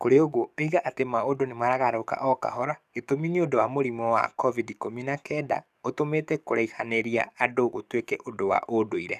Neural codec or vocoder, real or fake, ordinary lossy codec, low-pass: codec, 44.1 kHz, 7.8 kbps, DAC; fake; none; 14.4 kHz